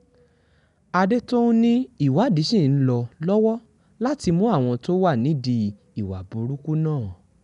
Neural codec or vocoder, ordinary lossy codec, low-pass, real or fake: none; none; 10.8 kHz; real